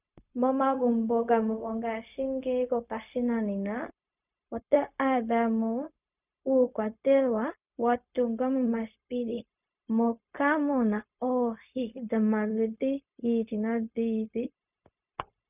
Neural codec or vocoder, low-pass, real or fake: codec, 16 kHz, 0.4 kbps, LongCat-Audio-Codec; 3.6 kHz; fake